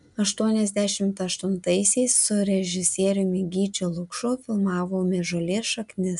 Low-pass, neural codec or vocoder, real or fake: 10.8 kHz; none; real